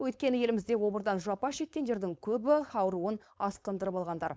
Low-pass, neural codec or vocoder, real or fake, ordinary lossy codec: none; codec, 16 kHz, 4.8 kbps, FACodec; fake; none